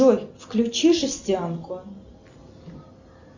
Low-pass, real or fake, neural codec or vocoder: 7.2 kHz; real; none